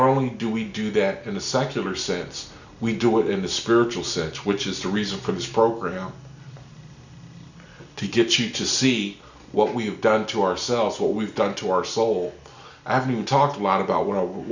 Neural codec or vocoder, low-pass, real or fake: none; 7.2 kHz; real